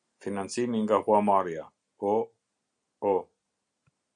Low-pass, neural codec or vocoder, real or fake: 9.9 kHz; none; real